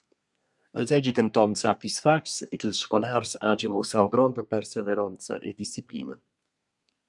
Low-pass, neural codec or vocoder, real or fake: 10.8 kHz; codec, 24 kHz, 1 kbps, SNAC; fake